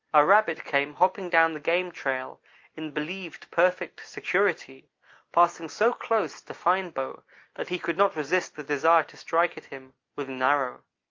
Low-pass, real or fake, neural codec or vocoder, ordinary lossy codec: 7.2 kHz; real; none; Opus, 24 kbps